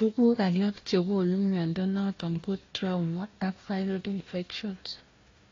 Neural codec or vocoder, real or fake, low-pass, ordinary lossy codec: codec, 16 kHz, 1 kbps, FunCodec, trained on Chinese and English, 50 frames a second; fake; 7.2 kHz; AAC, 32 kbps